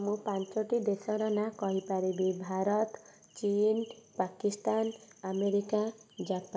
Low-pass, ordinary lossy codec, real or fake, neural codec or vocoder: none; none; real; none